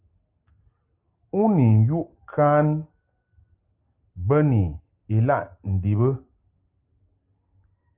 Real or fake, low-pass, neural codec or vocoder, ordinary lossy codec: real; 3.6 kHz; none; Opus, 32 kbps